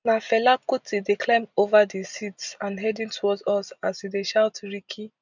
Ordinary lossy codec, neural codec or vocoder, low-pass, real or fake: none; none; 7.2 kHz; real